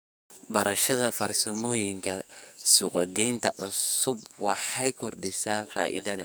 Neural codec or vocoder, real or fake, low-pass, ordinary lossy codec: codec, 44.1 kHz, 2.6 kbps, SNAC; fake; none; none